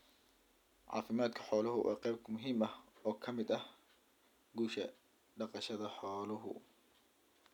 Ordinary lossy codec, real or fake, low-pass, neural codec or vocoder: none; real; 19.8 kHz; none